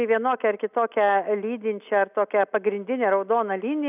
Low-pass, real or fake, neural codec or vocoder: 3.6 kHz; real; none